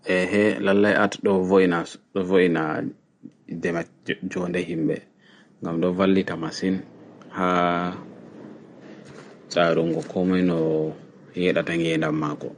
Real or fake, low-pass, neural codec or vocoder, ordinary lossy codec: real; 9.9 kHz; none; MP3, 48 kbps